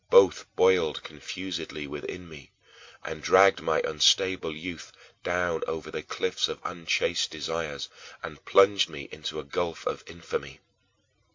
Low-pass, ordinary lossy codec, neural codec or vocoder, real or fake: 7.2 kHz; MP3, 48 kbps; none; real